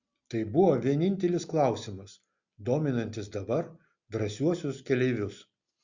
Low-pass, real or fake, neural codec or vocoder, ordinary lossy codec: 7.2 kHz; real; none; Opus, 64 kbps